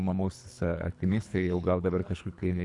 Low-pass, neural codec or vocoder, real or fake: 10.8 kHz; codec, 24 kHz, 3 kbps, HILCodec; fake